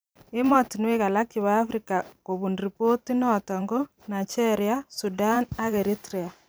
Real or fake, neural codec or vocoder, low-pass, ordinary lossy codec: fake; vocoder, 44.1 kHz, 128 mel bands every 512 samples, BigVGAN v2; none; none